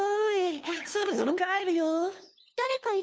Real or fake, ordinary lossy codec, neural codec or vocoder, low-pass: fake; none; codec, 16 kHz, 2 kbps, FunCodec, trained on LibriTTS, 25 frames a second; none